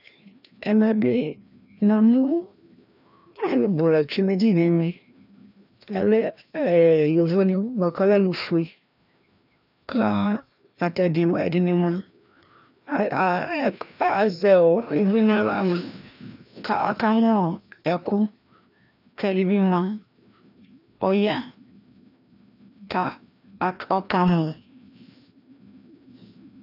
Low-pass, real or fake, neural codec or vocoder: 5.4 kHz; fake; codec, 16 kHz, 1 kbps, FreqCodec, larger model